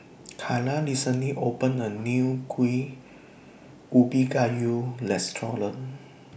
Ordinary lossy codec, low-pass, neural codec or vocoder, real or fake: none; none; none; real